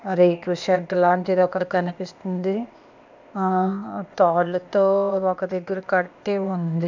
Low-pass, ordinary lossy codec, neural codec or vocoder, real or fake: 7.2 kHz; none; codec, 16 kHz, 0.8 kbps, ZipCodec; fake